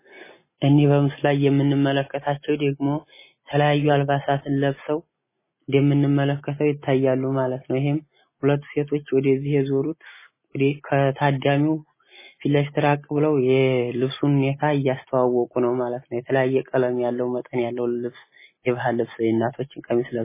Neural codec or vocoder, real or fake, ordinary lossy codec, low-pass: none; real; MP3, 16 kbps; 3.6 kHz